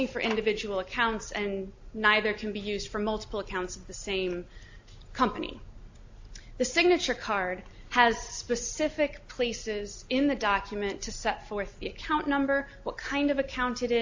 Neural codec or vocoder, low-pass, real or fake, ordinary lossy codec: none; 7.2 kHz; real; AAC, 48 kbps